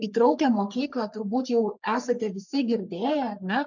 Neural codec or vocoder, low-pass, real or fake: codec, 44.1 kHz, 3.4 kbps, Pupu-Codec; 7.2 kHz; fake